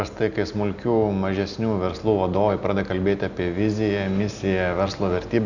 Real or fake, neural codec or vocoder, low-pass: real; none; 7.2 kHz